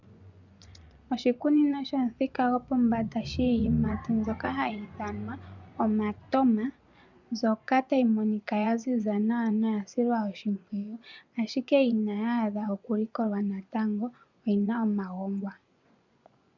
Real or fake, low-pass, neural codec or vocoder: fake; 7.2 kHz; vocoder, 24 kHz, 100 mel bands, Vocos